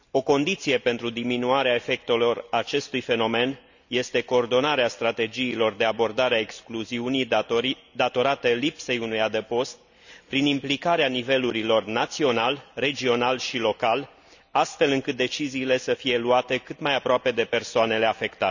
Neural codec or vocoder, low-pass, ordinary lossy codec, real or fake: none; 7.2 kHz; none; real